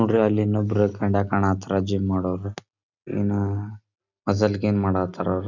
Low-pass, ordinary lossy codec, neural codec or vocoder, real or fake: 7.2 kHz; none; none; real